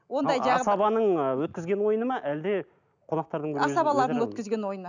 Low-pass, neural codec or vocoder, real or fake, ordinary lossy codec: 7.2 kHz; none; real; none